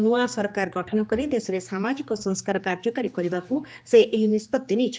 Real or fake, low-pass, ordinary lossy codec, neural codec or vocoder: fake; none; none; codec, 16 kHz, 2 kbps, X-Codec, HuBERT features, trained on general audio